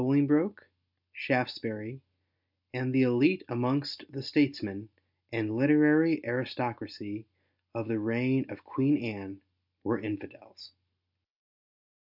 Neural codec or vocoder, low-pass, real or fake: none; 5.4 kHz; real